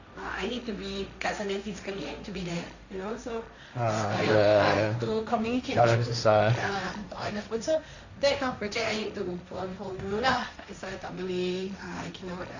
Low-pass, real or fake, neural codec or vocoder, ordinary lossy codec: 7.2 kHz; fake; codec, 16 kHz, 1.1 kbps, Voila-Tokenizer; none